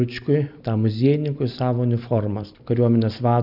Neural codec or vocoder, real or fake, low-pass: none; real; 5.4 kHz